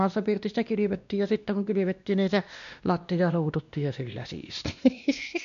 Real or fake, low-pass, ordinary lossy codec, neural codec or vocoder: fake; 7.2 kHz; none; codec, 16 kHz, 1 kbps, X-Codec, WavLM features, trained on Multilingual LibriSpeech